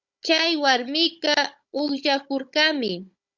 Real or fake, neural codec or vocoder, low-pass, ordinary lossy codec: fake; codec, 16 kHz, 16 kbps, FunCodec, trained on Chinese and English, 50 frames a second; 7.2 kHz; Opus, 64 kbps